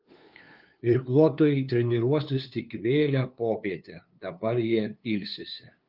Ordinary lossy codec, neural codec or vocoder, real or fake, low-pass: Opus, 24 kbps; codec, 16 kHz, 2 kbps, FunCodec, trained on LibriTTS, 25 frames a second; fake; 5.4 kHz